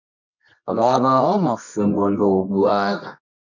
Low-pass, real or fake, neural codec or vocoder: 7.2 kHz; fake; codec, 24 kHz, 0.9 kbps, WavTokenizer, medium music audio release